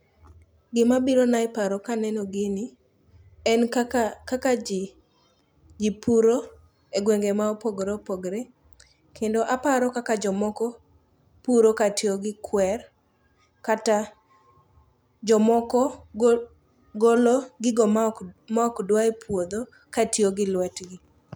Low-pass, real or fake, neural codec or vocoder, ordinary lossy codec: none; real; none; none